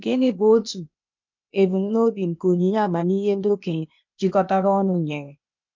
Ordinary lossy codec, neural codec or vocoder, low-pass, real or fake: MP3, 64 kbps; codec, 16 kHz, 0.8 kbps, ZipCodec; 7.2 kHz; fake